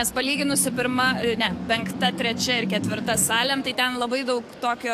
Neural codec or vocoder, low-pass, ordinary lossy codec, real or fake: codec, 44.1 kHz, 7.8 kbps, DAC; 14.4 kHz; AAC, 64 kbps; fake